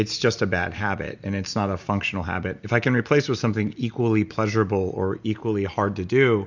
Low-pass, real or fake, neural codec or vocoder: 7.2 kHz; real; none